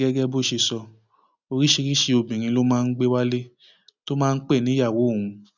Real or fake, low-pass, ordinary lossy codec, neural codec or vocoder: real; 7.2 kHz; none; none